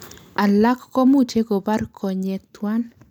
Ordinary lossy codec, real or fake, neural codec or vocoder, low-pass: none; real; none; 19.8 kHz